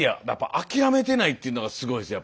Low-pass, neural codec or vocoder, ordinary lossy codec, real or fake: none; none; none; real